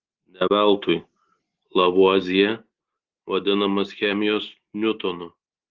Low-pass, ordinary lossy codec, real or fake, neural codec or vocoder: 7.2 kHz; Opus, 16 kbps; real; none